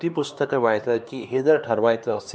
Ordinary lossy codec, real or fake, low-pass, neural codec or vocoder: none; fake; none; codec, 16 kHz, 2 kbps, X-Codec, HuBERT features, trained on LibriSpeech